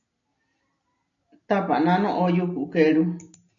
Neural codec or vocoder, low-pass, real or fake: none; 7.2 kHz; real